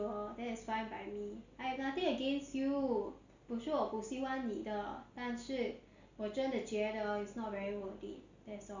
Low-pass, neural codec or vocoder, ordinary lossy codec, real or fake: 7.2 kHz; none; Opus, 64 kbps; real